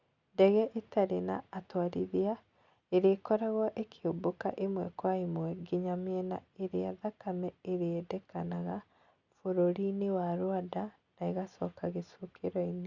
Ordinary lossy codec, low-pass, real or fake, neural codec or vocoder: Opus, 64 kbps; 7.2 kHz; real; none